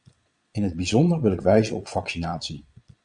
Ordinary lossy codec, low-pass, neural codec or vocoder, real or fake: Opus, 64 kbps; 9.9 kHz; vocoder, 22.05 kHz, 80 mel bands, Vocos; fake